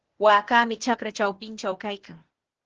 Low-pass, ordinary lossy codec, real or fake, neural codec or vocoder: 7.2 kHz; Opus, 16 kbps; fake; codec, 16 kHz, 0.8 kbps, ZipCodec